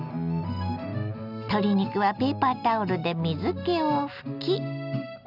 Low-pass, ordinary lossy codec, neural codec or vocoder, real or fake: 5.4 kHz; none; none; real